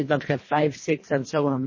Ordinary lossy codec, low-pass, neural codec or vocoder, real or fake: MP3, 32 kbps; 7.2 kHz; codec, 24 kHz, 1.5 kbps, HILCodec; fake